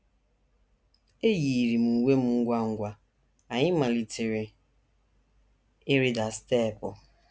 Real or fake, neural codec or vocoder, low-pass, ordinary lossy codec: real; none; none; none